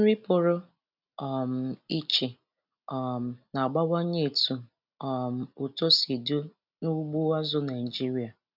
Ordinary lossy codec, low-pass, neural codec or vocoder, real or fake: none; 5.4 kHz; none; real